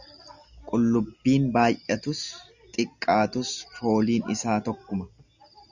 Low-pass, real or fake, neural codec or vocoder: 7.2 kHz; real; none